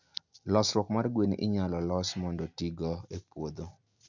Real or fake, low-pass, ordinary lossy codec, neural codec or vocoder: real; 7.2 kHz; none; none